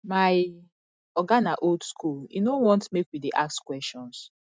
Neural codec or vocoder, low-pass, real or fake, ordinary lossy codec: none; none; real; none